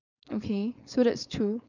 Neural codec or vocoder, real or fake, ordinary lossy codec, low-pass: codec, 16 kHz, 4.8 kbps, FACodec; fake; none; 7.2 kHz